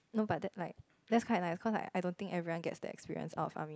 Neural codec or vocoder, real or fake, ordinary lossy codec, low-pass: none; real; none; none